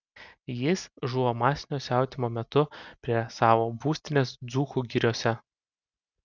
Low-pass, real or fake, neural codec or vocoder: 7.2 kHz; real; none